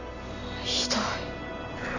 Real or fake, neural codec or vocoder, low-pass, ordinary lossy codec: real; none; 7.2 kHz; none